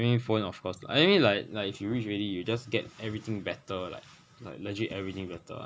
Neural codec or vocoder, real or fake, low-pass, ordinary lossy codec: none; real; none; none